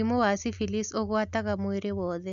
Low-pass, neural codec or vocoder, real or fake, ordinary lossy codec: 7.2 kHz; none; real; none